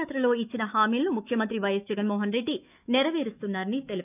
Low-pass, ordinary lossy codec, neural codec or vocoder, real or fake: 3.6 kHz; none; autoencoder, 48 kHz, 128 numbers a frame, DAC-VAE, trained on Japanese speech; fake